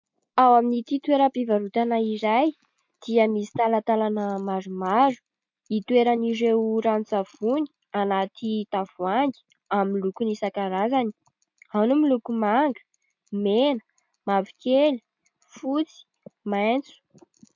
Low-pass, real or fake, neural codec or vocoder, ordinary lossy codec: 7.2 kHz; real; none; MP3, 48 kbps